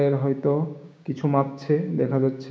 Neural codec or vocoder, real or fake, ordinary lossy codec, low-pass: codec, 16 kHz, 6 kbps, DAC; fake; none; none